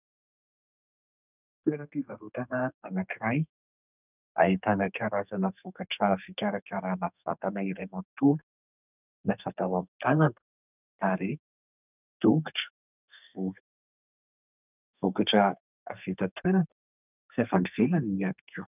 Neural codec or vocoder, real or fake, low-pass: codec, 32 kHz, 1.9 kbps, SNAC; fake; 3.6 kHz